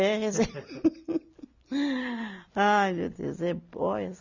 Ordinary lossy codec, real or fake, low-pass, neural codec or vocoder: none; real; 7.2 kHz; none